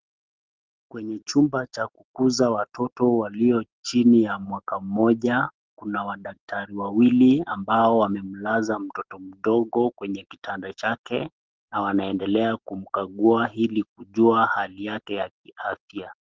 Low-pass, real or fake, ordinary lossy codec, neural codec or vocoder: 7.2 kHz; real; Opus, 16 kbps; none